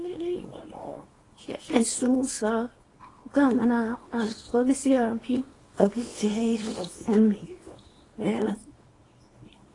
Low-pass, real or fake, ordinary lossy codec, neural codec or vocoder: 10.8 kHz; fake; AAC, 32 kbps; codec, 24 kHz, 0.9 kbps, WavTokenizer, small release